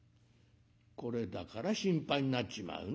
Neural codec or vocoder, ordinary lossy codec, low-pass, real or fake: none; none; none; real